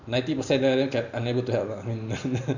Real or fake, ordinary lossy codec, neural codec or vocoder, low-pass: fake; none; vocoder, 44.1 kHz, 128 mel bands every 512 samples, BigVGAN v2; 7.2 kHz